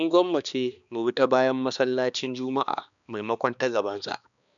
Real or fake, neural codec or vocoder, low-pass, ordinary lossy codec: fake; codec, 16 kHz, 2 kbps, X-Codec, HuBERT features, trained on balanced general audio; 7.2 kHz; none